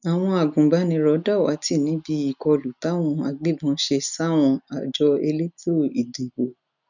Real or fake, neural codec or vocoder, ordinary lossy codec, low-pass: real; none; none; 7.2 kHz